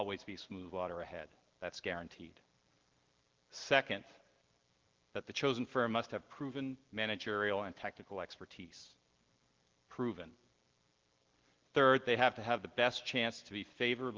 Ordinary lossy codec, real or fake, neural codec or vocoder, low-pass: Opus, 16 kbps; real; none; 7.2 kHz